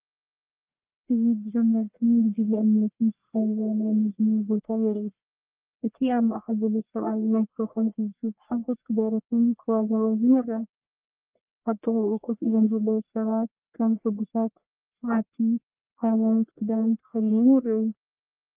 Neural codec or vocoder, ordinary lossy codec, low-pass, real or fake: codec, 44.1 kHz, 1.7 kbps, Pupu-Codec; Opus, 32 kbps; 3.6 kHz; fake